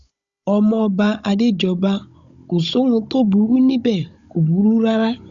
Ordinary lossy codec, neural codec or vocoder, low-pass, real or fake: Opus, 64 kbps; codec, 16 kHz, 16 kbps, FunCodec, trained on Chinese and English, 50 frames a second; 7.2 kHz; fake